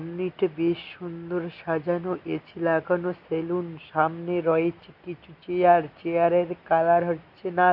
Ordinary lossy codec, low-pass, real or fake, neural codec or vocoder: AAC, 48 kbps; 5.4 kHz; real; none